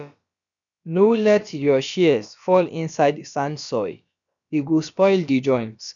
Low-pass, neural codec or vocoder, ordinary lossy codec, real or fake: 7.2 kHz; codec, 16 kHz, about 1 kbps, DyCAST, with the encoder's durations; none; fake